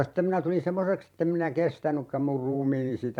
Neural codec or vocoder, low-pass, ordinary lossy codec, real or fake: vocoder, 44.1 kHz, 128 mel bands every 512 samples, BigVGAN v2; 19.8 kHz; none; fake